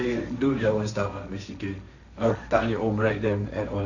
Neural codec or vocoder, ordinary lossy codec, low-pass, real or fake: codec, 16 kHz, 1.1 kbps, Voila-Tokenizer; none; 7.2 kHz; fake